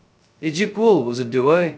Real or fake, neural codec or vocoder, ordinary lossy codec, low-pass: fake; codec, 16 kHz, 0.2 kbps, FocalCodec; none; none